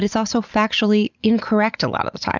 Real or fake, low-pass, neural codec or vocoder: real; 7.2 kHz; none